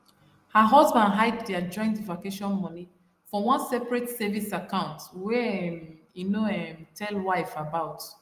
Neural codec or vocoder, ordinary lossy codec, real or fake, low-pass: none; Opus, 32 kbps; real; 14.4 kHz